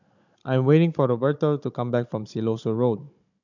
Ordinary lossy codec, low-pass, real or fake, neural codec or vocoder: none; 7.2 kHz; fake; codec, 16 kHz, 16 kbps, FunCodec, trained on Chinese and English, 50 frames a second